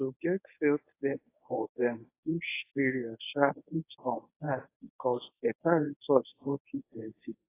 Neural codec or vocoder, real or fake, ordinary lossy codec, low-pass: codec, 24 kHz, 0.9 kbps, WavTokenizer, medium speech release version 1; fake; AAC, 24 kbps; 3.6 kHz